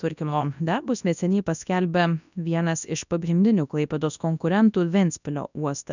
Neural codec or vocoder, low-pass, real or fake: codec, 24 kHz, 0.9 kbps, WavTokenizer, large speech release; 7.2 kHz; fake